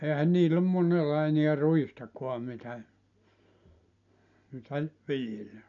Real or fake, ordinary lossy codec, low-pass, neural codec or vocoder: real; none; 7.2 kHz; none